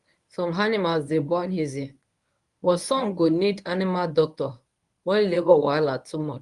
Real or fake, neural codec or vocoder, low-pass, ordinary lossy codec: fake; codec, 24 kHz, 0.9 kbps, WavTokenizer, medium speech release version 1; 10.8 kHz; Opus, 32 kbps